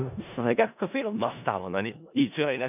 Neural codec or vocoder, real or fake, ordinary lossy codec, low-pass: codec, 16 kHz in and 24 kHz out, 0.4 kbps, LongCat-Audio-Codec, four codebook decoder; fake; none; 3.6 kHz